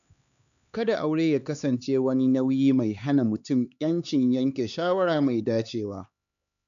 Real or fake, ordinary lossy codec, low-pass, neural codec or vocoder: fake; none; 7.2 kHz; codec, 16 kHz, 4 kbps, X-Codec, HuBERT features, trained on LibriSpeech